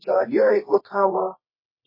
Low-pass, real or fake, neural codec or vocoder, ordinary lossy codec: 5.4 kHz; fake; codec, 24 kHz, 0.9 kbps, WavTokenizer, medium music audio release; MP3, 24 kbps